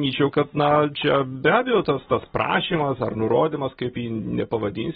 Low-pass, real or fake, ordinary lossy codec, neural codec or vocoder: 7.2 kHz; real; AAC, 16 kbps; none